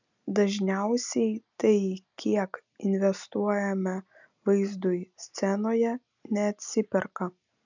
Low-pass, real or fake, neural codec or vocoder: 7.2 kHz; real; none